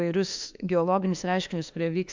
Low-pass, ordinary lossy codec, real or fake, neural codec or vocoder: 7.2 kHz; MP3, 64 kbps; fake; autoencoder, 48 kHz, 32 numbers a frame, DAC-VAE, trained on Japanese speech